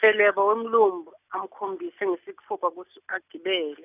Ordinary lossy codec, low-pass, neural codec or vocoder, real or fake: none; 3.6 kHz; none; real